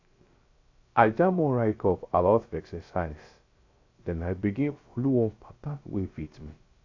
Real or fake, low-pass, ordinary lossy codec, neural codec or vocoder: fake; 7.2 kHz; AAC, 48 kbps; codec, 16 kHz, 0.3 kbps, FocalCodec